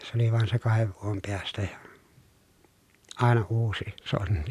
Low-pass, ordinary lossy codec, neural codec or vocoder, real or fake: 14.4 kHz; none; none; real